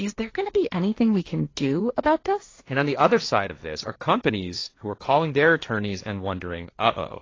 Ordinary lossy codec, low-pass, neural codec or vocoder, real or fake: AAC, 32 kbps; 7.2 kHz; codec, 16 kHz, 1.1 kbps, Voila-Tokenizer; fake